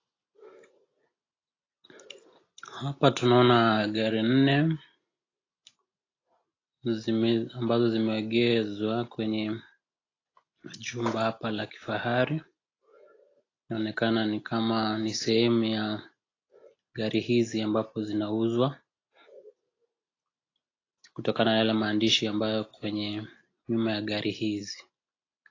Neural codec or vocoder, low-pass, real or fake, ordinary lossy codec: none; 7.2 kHz; real; AAC, 32 kbps